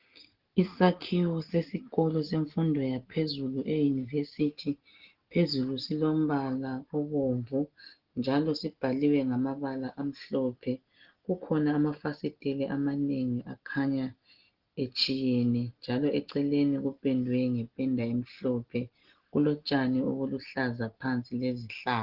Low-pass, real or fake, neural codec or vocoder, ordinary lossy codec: 5.4 kHz; real; none; Opus, 16 kbps